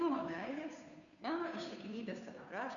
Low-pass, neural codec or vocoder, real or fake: 7.2 kHz; codec, 16 kHz, 2 kbps, FunCodec, trained on Chinese and English, 25 frames a second; fake